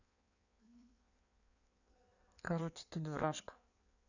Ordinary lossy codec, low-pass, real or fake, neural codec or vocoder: none; 7.2 kHz; fake; codec, 16 kHz in and 24 kHz out, 1.1 kbps, FireRedTTS-2 codec